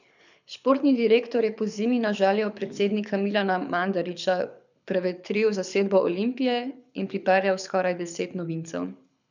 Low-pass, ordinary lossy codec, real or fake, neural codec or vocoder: 7.2 kHz; none; fake; codec, 24 kHz, 6 kbps, HILCodec